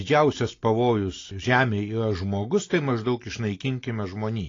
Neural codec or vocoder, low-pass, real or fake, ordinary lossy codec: none; 7.2 kHz; real; AAC, 32 kbps